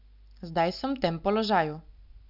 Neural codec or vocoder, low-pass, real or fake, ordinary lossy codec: none; 5.4 kHz; real; none